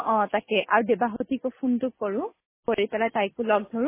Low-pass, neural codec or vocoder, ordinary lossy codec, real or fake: 3.6 kHz; none; MP3, 16 kbps; real